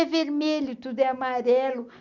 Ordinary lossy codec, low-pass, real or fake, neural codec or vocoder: none; 7.2 kHz; real; none